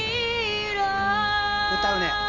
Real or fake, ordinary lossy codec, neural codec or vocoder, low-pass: real; none; none; 7.2 kHz